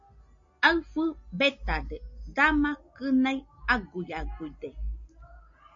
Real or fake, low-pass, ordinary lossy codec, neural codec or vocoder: real; 7.2 kHz; MP3, 48 kbps; none